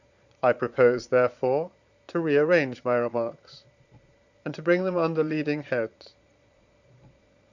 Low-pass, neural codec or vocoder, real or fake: 7.2 kHz; vocoder, 22.05 kHz, 80 mel bands, Vocos; fake